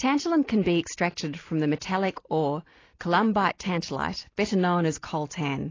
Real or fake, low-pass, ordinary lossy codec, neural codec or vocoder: real; 7.2 kHz; AAC, 32 kbps; none